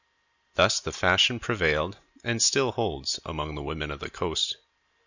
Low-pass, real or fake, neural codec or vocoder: 7.2 kHz; real; none